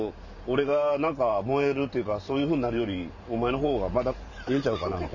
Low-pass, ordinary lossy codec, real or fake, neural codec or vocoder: 7.2 kHz; none; fake; vocoder, 44.1 kHz, 128 mel bands every 512 samples, BigVGAN v2